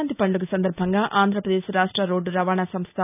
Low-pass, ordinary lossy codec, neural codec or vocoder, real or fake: 3.6 kHz; none; none; real